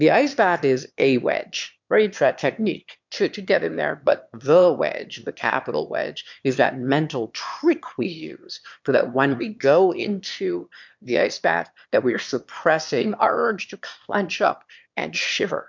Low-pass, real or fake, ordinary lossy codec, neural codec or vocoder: 7.2 kHz; fake; MP3, 64 kbps; autoencoder, 22.05 kHz, a latent of 192 numbers a frame, VITS, trained on one speaker